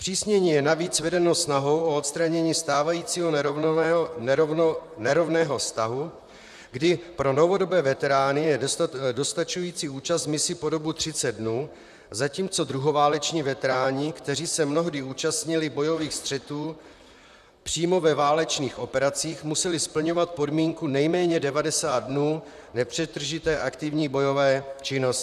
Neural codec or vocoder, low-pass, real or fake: vocoder, 44.1 kHz, 128 mel bands, Pupu-Vocoder; 14.4 kHz; fake